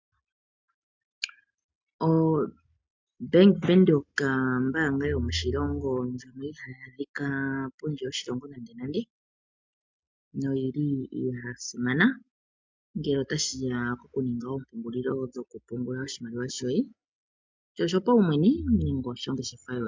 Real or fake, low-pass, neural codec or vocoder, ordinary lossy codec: real; 7.2 kHz; none; MP3, 64 kbps